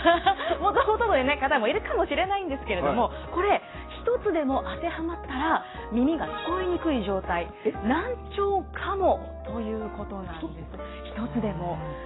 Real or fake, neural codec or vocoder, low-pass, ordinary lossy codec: real; none; 7.2 kHz; AAC, 16 kbps